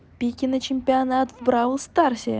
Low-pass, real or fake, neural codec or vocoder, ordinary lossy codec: none; real; none; none